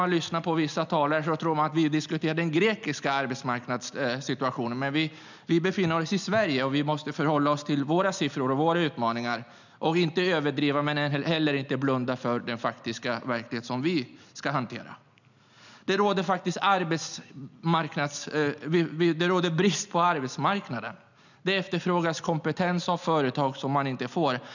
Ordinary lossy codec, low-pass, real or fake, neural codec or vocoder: none; 7.2 kHz; real; none